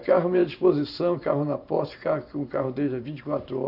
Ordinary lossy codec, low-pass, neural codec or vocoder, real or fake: none; 5.4 kHz; vocoder, 44.1 kHz, 128 mel bands every 512 samples, BigVGAN v2; fake